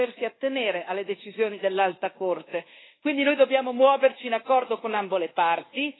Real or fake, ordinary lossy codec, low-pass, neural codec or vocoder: fake; AAC, 16 kbps; 7.2 kHz; codec, 24 kHz, 1.2 kbps, DualCodec